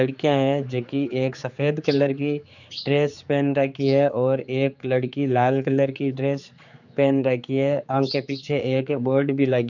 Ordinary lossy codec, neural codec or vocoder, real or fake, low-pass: none; codec, 16 kHz, 4 kbps, X-Codec, HuBERT features, trained on general audio; fake; 7.2 kHz